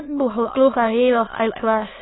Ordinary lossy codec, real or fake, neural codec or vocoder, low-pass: AAC, 16 kbps; fake; autoencoder, 22.05 kHz, a latent of 192 numbers a frame, VITS, trained on many speakers; 7.2 kHz